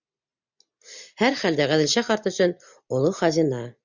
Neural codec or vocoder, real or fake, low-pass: none; real; 7.2 kHz